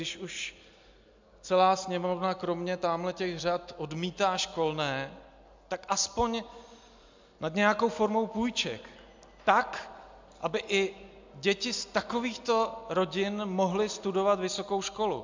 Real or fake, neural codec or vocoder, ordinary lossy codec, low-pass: real; none; MP3, 64 kbps; 7.2 kHz